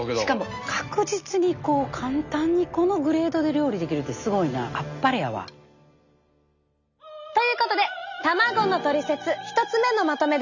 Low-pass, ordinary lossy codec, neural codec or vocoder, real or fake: 7.2 kHz; none; none; real